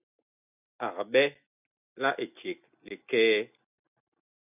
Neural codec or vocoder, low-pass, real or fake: none; 3.6 kHz; real